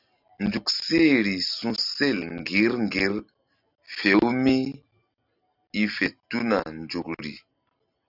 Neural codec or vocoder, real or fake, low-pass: none; real; 5.4 kHz